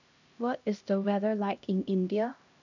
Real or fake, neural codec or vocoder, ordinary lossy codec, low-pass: fake; codec, 16 kHz, 1 kbps, X-Codec, HuBERT features, trained on LibriSpeech; none; 7.2 kHz